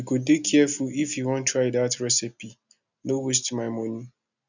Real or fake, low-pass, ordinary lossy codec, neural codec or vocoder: real; 7.2 kHz; none; none